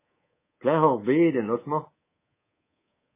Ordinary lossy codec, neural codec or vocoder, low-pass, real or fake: MP3, 16 kbps; codec, 24 kHz, 3.1 kbps, DualCodec; 3.6 kHz; fake